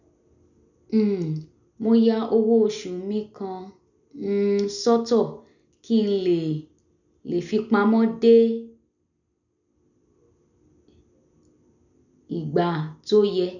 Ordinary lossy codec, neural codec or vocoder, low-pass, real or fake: none; none; 7.2 kHz; real